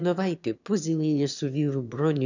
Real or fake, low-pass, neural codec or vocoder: fake; 7.2 kHz; autoencoder, 22.05 kHz, a latent of 192 numbers a frame, VITS, trained on one speaker